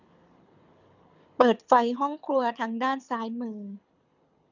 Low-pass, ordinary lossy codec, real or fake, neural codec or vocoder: 7.2 kHz; none; fake; codec, 24 kHz, 6 kbps, HILCodec